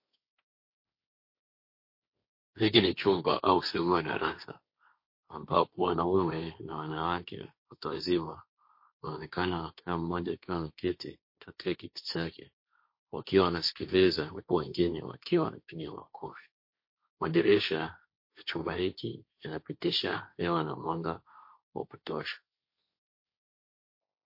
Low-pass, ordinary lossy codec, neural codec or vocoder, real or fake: 5.4 kHz; MP3, 32 kbps; codec, 16 kHz, 1.1 kbps, Voila-Tokenizer; fake